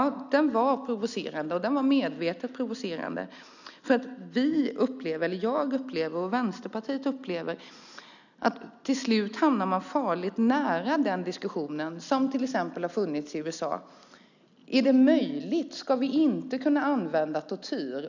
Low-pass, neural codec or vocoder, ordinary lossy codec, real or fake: 7.2 kHz; none; none; real